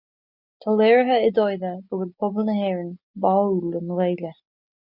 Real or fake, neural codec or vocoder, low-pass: real; none; 5.4 kHz